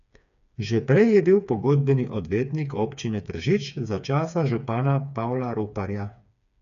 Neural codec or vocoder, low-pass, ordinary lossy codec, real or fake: codec, 16 kHz, 4 kbps, FreqCodec, smaller model; 7.2 kHz; none; fake